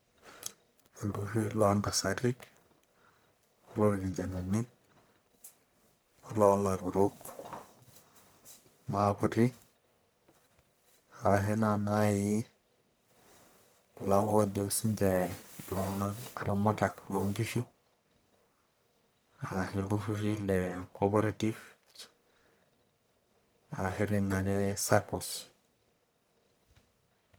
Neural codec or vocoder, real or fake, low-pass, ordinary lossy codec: codec, 44.1 kHz, 1.7 kbps, Pupu-Codec; fake; none; none